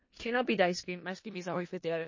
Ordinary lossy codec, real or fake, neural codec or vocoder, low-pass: MP3, 32 kbps; fake; codec, 16 kHz in and 24 kHz out, 0.4 kbps, LongCat-Audio-Codec, four codebook decoder; 7.2 kHz